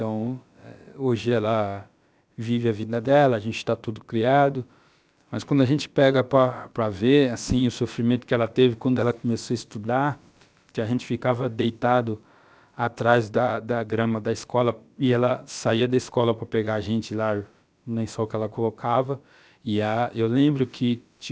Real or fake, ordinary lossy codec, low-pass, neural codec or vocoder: fake; none; none; codec, 16 kHz, about 1 kbps, DyCAST, with the encoder's durations